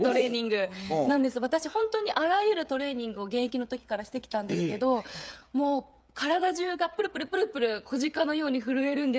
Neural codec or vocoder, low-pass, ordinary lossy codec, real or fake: codec, 16 kHz, 8 kbps, FreqCodec, smaller model; none; none; fake